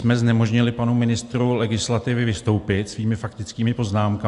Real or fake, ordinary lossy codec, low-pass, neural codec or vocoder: real; AAC, 64 kbps; 10.8 kHz; none